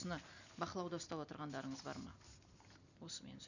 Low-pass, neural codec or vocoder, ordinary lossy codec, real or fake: 7.2 kHz; none; none; real